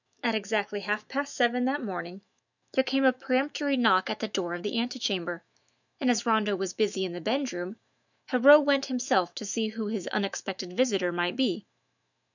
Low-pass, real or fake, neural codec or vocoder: 7.2 kHz; fake; autoencoder, 48 kHz, 128 numbers a frame, DAC-VAE, trained on Japanese speech